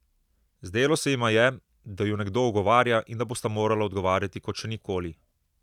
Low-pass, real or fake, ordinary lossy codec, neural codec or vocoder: 19.8 kHz; real; none; none